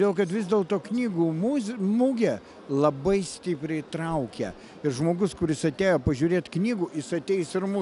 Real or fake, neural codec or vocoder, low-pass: real; none; 10.8 kHz